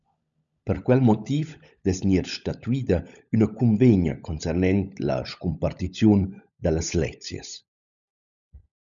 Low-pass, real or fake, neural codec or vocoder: 7.2 kHz; fake; codec, 16 kHz, 16 kbps, FunCodec, trained on LibriTTS, 50 frames a second